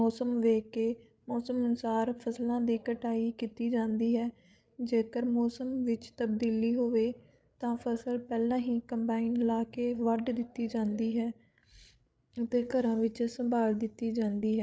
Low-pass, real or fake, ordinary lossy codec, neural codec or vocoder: none; fake; none; codec, 16 kHz, 8 kbps, FreqCodec, larger model